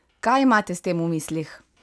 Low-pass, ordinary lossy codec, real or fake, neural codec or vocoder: none; none; real; none